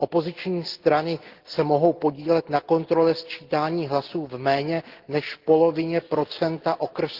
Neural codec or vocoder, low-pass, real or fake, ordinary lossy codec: none; 5.4 kHz; real; Opus, 16 kbps